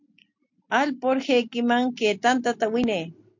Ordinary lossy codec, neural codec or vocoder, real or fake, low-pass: MP3, 48 kbps; none; real; 7.2 kHz